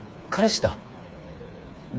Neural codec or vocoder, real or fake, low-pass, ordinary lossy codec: codec, 16 kHz, 4 kbps, FreqCodec, smaller model; fake; none; none